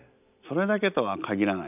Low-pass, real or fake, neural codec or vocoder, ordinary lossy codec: 3.6 kHz; real; none; none